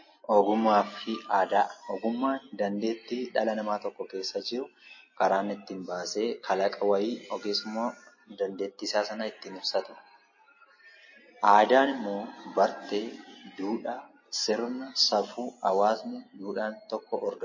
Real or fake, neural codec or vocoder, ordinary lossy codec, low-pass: real; none; MP3, 32 kbps; 7.2 kHz